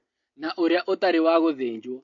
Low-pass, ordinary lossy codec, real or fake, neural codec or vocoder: 7.2 kHz; MP3, 48 kbps; real; none